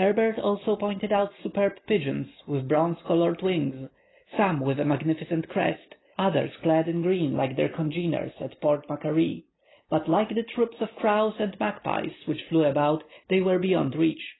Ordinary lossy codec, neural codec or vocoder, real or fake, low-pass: AAC, 16 kbps; none; real; 7.2 kHz